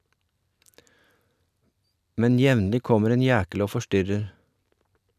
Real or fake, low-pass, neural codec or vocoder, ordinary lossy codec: real; 14.4 kHz; none; none